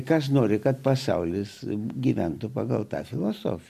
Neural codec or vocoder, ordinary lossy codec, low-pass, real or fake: none; MP3, 64 kbps; 14.4 kHz; real